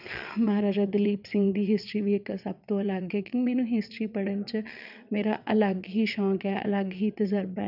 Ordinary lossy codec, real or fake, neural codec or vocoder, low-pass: none; fake; vocoder, 22.05 kHz, 80 mel bands, WaveNeXt; 5.4 kHz